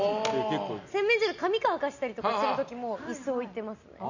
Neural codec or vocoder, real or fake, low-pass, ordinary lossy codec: none; real; 7.2 kHz; none